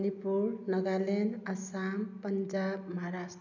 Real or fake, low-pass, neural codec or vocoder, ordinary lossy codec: real; 7.2 kHz; none; none